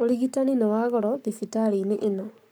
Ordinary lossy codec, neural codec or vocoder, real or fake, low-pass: none; codec, 44.1 kHz, 7.8 kbps, Pupu-Codec; fake; none